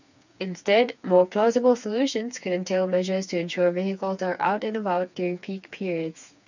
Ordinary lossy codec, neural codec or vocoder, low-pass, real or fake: none; codec, 16 kHz, 4 kbps, FreqCodec, smaller model; 7.2 kHz; fake